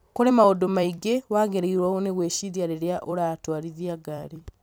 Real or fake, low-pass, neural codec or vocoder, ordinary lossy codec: fake; none; vocoder, 44.1 kHz, 128 mel bands every 256 samples, BigVGAN v2; none